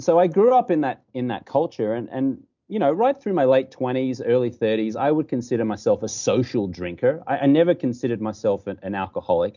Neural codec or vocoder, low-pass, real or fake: none; 7.2 kHz; real